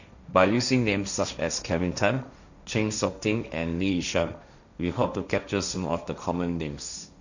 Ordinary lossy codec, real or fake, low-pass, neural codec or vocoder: none; fake; 7.2 kHz; codec, 16 kHz, 1.1 kbps, Voila-Tokenizer